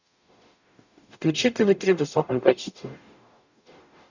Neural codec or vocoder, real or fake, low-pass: codec, 44.1 kHz, 0.9 kbps, DAC; fake; 7.2 kHz